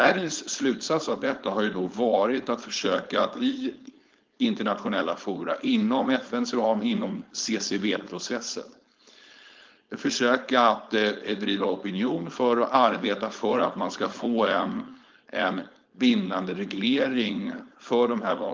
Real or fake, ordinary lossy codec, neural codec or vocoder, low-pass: fake; Opus, 32 kbps; codec, 16 kHz, 4.8 kbps, FACodec; 7.2 kHz